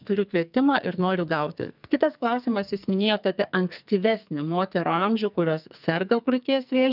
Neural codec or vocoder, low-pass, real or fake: codec, 44.1 kHz, 2.6 kbps, SNAC; 5.4 kHz; fake